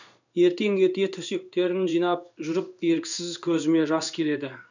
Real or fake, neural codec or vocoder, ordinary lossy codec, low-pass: fake; codec, 16 kHz in and 24 kHz out, 1 kbps, XY-Tokenizer; none; 7.2 kHz